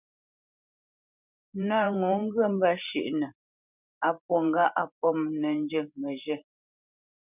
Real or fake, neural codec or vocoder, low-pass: fake; vocoder, 44.1 kHz, 128 mel bands every 512 samples, BigVGAN v2; 3.6 kHz